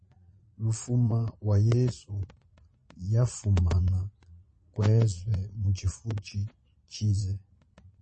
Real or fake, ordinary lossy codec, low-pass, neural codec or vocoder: fake; MP3, 32 kbps; 9.9 kHz; vocoder, 22.05 kHz, 80 mel bands, Vocos